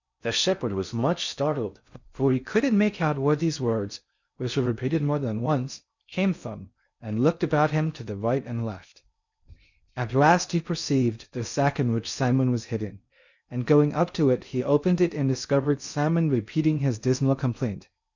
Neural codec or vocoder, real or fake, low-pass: codec, 16 kHz in and 24 kHz out, 0.6 kbps, FocalCodec, streaming, 4096 codes; fake; 7.2 kHz